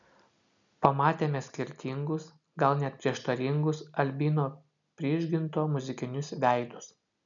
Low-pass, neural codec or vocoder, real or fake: 7.2 kHz; none; real